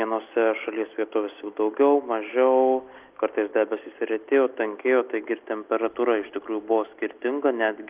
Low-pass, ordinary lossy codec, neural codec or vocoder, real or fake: 3.6 kHz; Opus, 24 kbps; none; real